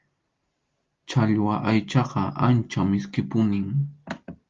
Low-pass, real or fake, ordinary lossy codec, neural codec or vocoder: 7.2 kHz; real; Opus, 32 kbps; none